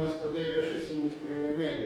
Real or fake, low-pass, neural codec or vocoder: fake; 19.8 kHz; codec, 44.1 kHz, 2.6 kbps, DAC